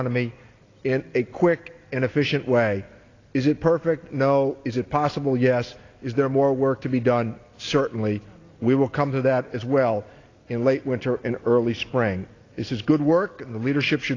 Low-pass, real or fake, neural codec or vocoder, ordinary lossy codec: 7.2 kHz; real; none; AAC, 32 kbps